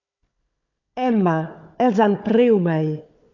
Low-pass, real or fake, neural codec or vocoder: 7.2 kHz; fake; codec, 16 kHz, 4 kbps, FunCodec, trained on Chinese and English, 50 frames a second